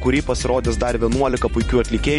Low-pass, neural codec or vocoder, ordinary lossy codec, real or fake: 19.8 kHz; vocoder, 44.1 kHz, 128 mel bands every 512 samples, BigVGAN v2; MP3, 48 kbps; fake